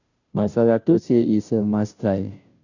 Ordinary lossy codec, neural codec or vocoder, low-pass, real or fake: none; codec, 16 kHz, 0.5 kbps, FunCodec, trained on Chinese and English, 25 frames a second; 7.2 kHz; fake